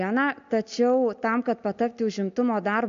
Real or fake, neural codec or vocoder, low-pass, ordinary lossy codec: real; none; 7.2 kHz; MP3, 64 kbps